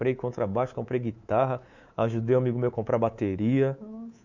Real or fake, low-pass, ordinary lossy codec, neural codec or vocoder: fake; 7.2 kHz; none; codec, 24 kHz, 3.1 kbps, DualCodec